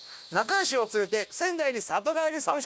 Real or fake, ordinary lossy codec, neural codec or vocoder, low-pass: fake; none; codec, 16 kHz, 1 kbps, FunCodec, trained on Chinese and English, 50 frames a second; none